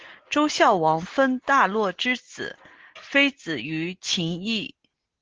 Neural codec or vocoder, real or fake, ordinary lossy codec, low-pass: none; real; Opus, 16 kbps; 7.2 kHz